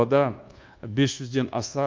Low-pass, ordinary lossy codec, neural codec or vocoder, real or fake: 7.2 kHz; Opus, 32 kbps; codec, 24 kHz, 1.2 kbps, DualCodec; fake